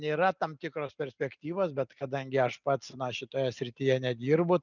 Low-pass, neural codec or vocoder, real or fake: 7.2 kHz; none; real